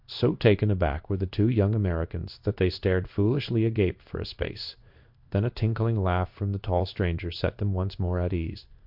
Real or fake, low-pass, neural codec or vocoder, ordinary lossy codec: fake; 5.4 kHz; codec, 16 kHz in and 24 kHz out, 1 kbps, XY-Tokenizer; MP3, 48 kbps